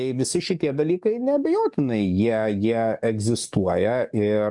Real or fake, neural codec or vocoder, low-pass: fake; codec, 44.1 kHz, 7.8 kbps, DAC; 10.8 kHz